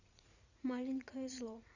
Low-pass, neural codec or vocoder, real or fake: 7.2 kHz; vocoder, 44.1 kHz, 80 mel bands, Vocos; fake